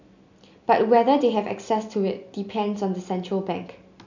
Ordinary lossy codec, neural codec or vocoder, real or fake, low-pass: none; none; real; 7.2 kHz